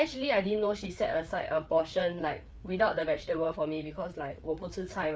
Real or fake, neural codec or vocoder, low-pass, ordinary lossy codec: fake; codec, 16 kHz, 4 kbps, FreqCodec, larger model; none; none